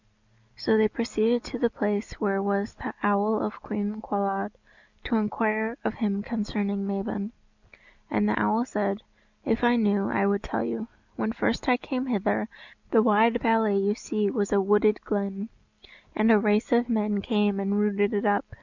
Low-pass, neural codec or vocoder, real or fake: 7.2 kHz; none; real